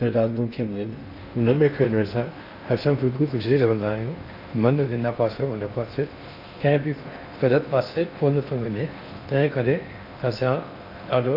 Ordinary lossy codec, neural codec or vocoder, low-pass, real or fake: none; codec, 16 kHz in and 24 kHz out, 0.6 kbps, FocalCodec, streaming, 4096 codes; 5.4 kHz; fake